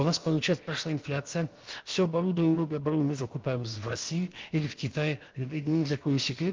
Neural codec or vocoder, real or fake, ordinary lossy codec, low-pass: codec, 16 kHz, about 1 kbps, DyCAST, with the encoder's durations; fake; Opus, 32 kbps; 7.2 kHz